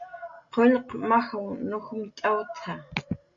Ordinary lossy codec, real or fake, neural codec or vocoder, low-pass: MP3, 48 kbps; real; none; 7.2 kHz